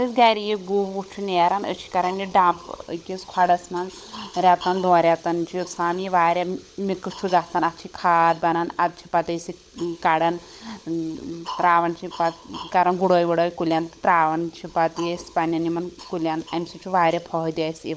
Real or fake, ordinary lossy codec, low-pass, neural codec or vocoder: fake; none; none; codec, 16 kHz, 16 kbps, FunCodec, trained on LibriTTS, 50 frames a second